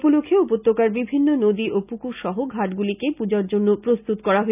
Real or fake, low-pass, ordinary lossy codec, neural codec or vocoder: real; 3.6 kHz; none; none